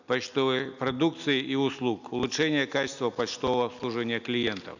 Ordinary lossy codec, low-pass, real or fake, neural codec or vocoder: none; 7.2 kHz; real; none